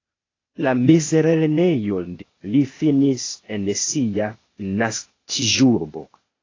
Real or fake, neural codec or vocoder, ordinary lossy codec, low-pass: fake; codec, 16 kHz, 0.8 kbps, ZipCodec; AAC, 32 kbps; 7.2 kHz